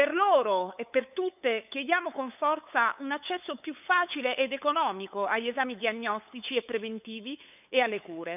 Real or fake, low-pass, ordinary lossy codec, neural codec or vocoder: fake; 3.6 kHz; none; codec, 16 kHz, 8 kbps, FunCodec, trained on LibriTTS, 25 frames a second